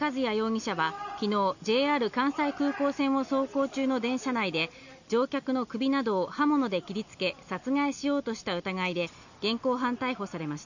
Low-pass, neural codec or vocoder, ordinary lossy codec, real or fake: 7.2 kHz; none; none; real